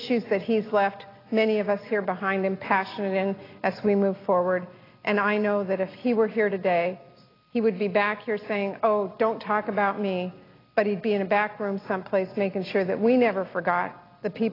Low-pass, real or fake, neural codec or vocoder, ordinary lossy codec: 5.4 kHz; real; none; AAC, 24 kbps